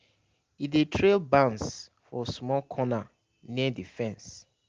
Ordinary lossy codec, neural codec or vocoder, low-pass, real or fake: Opus, 24 kbps; none; 7.2 kHz; real